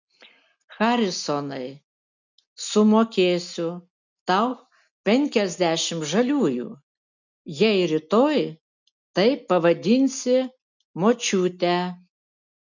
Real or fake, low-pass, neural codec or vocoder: real; 7.2 kHz; none